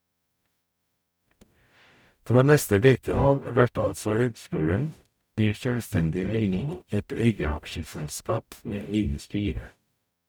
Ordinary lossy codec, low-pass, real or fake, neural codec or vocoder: none; none; fake; codec, 44.1 kHz, 0.9 kbps, DAC